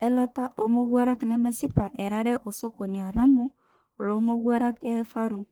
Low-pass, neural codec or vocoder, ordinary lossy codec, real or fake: none; codec, 44.1 kHz, 1.7 kbps, Pupu-Codec; none; fake